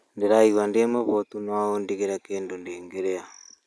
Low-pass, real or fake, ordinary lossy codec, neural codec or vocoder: none; real; none; none